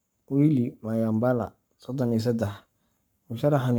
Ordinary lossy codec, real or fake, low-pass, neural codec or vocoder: none; fake; none; codec, 44.1 kHz, 7.8 kbps, Pupu-Codec